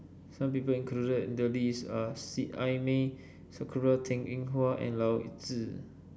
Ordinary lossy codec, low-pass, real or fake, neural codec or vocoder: none; none; real; none